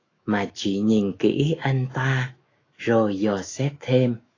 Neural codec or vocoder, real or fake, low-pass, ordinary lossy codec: autoencoder, 48 kHz, 128 numbers a frame, DAC-VAE, trained on Japanese speech; fake; 7.2 kHz; AAC, 32 kbps